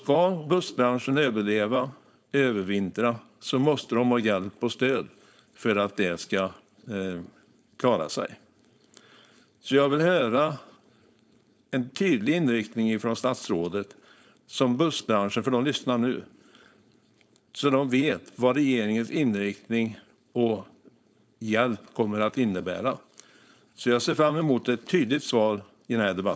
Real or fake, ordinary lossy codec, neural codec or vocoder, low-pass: fake; none; codec, 16 kHz, 4.8 kbps, FACodec; none